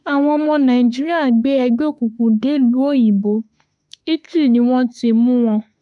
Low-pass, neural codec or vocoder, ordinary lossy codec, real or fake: 10.8 kHz; autoencoder, 48 kHz, 32 numbers a frame, DAC-VAE, trained on Japanese speech; none; fake